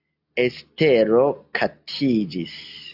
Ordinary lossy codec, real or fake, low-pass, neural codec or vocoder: AAC, 48 kbps; real; 5.4 kHz; none